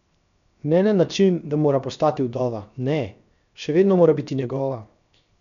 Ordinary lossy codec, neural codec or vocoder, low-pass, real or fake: none; codec, 16 kHz, 0.7 kbps, FocalCodec; 7.2 kHz; fake